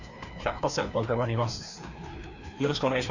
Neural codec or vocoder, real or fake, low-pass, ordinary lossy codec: codec, 16 kHz, 2 kbps, FreqCodec, larger model; fake; 7.2 kHz; none